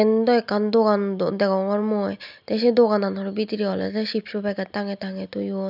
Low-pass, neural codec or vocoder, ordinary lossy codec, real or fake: 5.4 kHz; none; none; real